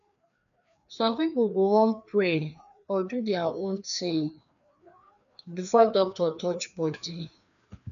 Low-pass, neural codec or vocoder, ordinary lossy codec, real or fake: 7.2 kHz; codec, 16 kHz, 2 kbps, FreqCodec, larger model; none; fake